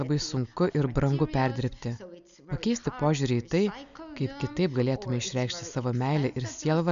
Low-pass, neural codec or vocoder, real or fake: 7.2 kHz; none; real